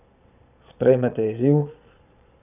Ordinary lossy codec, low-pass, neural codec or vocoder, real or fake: none; 3.6 kHz; vocoder, 22.05 kHz, 80 mel bands, Vocos; fake